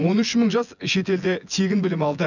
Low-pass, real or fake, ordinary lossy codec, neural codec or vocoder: 7.2 kHz; fake; none; vocoder, 24 kHz, 100 mel bands, Vocos